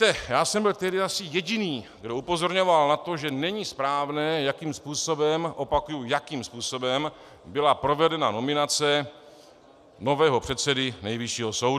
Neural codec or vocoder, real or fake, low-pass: none; real; 14.4 kHz